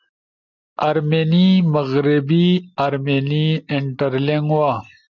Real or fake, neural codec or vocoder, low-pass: real; none; 7.2 kHz